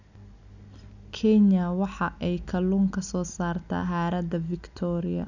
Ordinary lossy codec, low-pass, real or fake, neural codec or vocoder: none; 7.2 kHz; real; none